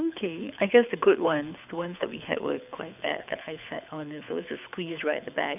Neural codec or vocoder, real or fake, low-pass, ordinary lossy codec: codec, 24 kHz, 6 kbps, HILCodec; fake; 3.6 kHz; none